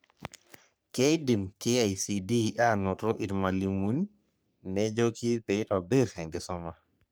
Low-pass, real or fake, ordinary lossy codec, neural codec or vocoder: none; fake; none; codec, 44.1 kHz, 3.4 kbps, Pupu-Codec